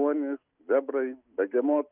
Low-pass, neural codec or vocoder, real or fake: 3.6 kHz; none; real